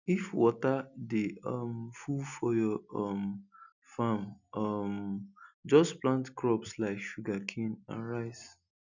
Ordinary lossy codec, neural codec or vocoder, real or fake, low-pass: none; none; real; 7.2 kHz